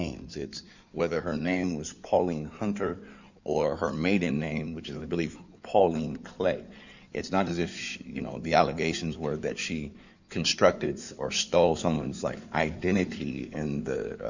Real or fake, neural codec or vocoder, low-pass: fake; codec, 16 kHz in and 24 kHz out, 2.2 kbps, FireRedTTS-2 codec; 7.2 kHz